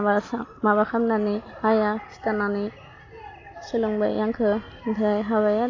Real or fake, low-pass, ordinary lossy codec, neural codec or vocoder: real; 7.2 kHz; AAC, 32 kbps; none